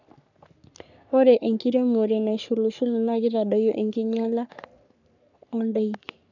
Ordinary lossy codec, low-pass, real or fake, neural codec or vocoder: none; 7.2 kHz; fake; codec, 44.1 kHz, 3.4 kbps, Pupu-Codec